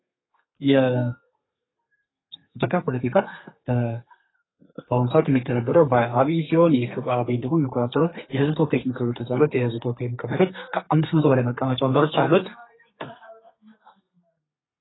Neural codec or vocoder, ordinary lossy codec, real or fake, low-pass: codec, 32 kHz, 1.9 kbps, SNAC; AAC, 16 kbps; fake; 7.2 kHz